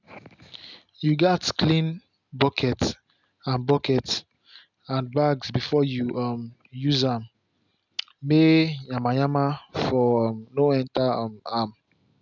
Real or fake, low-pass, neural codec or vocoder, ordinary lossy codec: real; 7.2 kHz; none; none